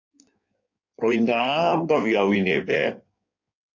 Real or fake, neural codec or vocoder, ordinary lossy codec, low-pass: fake; codec, 16 kHz in and 24 kHz out, 1.1 kbps, FireRedTTS-2 codec; AAC, 48 kbps; 7.2 kHz